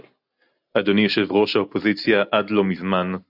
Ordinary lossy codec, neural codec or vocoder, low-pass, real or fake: AAC, 48 kbps; none; 5.4 kHz; real